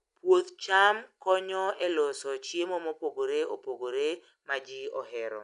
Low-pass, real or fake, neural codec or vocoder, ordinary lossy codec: 10.8 kHz; real; none; none